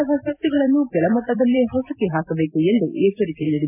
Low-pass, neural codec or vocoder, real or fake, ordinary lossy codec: 3.6 kHz; vocoder, 44.1 kHz, 128 mel bands every 256 samples, BigVGAN v2; fake; AAC, 32 kbps